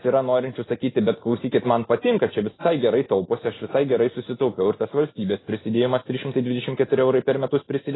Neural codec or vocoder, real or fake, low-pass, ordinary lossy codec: none; real; 7.2 kHz; AAC, 16 kbps